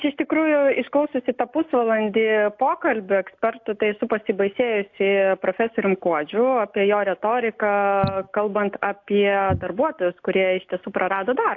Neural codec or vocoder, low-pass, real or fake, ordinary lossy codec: none; 7.2 kHz; real; AAC, 48 kbps